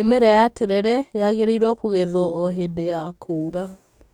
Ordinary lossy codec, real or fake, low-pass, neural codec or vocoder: none; fake; 19.8 kHz; codec, 44.1 kHz, 2.6 kbps, DAC